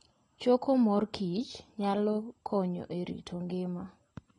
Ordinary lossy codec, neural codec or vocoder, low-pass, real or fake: AAC, 32 kbps; none; 10.8 kHz; real